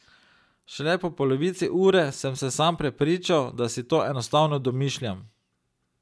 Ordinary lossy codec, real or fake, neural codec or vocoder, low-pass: none; real; none; none